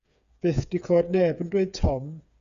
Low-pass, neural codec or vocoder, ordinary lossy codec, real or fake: 7.2 kHz; codec, 16 kHz, 8 kbps, FreqCodec, smaller model; Opus, 64 kbps; fake